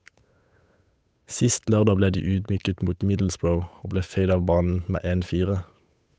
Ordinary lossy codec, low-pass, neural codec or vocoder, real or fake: none; none; codec, 16 kHz, 8 kbps, FunCodec, trained on Chinese and English, 25 frames a second; fake